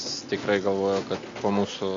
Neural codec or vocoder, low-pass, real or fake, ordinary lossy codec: none; 7.2 kHz; real; MP3, 48 kbps